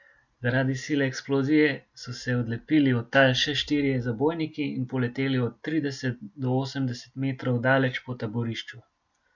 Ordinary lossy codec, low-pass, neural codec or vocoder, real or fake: none; 7.2 kHz; none; real